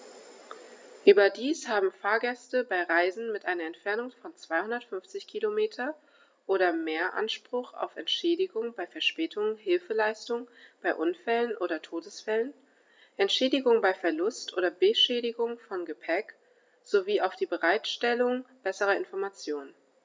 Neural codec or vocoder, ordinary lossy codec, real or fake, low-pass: none; none; real; none